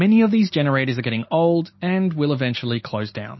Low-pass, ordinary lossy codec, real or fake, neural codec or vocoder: 7.2 kHz; MP3, 24 kbps; real; none